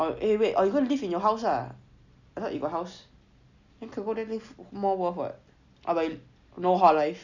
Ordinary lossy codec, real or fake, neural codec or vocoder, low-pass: none; real; none; 7.2 kHz